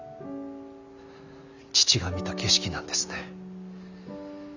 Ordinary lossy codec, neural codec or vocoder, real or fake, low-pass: none; none; real; 7.2 kHz